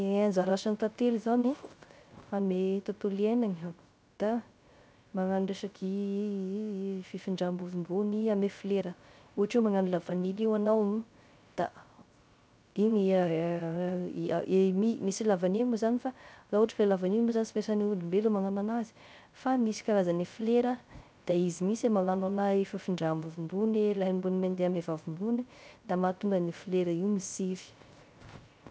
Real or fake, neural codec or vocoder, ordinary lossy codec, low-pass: fake; codec, 16 kHz, 0.3 kbps, FocalCodec; none; none